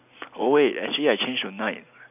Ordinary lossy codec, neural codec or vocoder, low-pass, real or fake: none; none; 3.6 kHz; real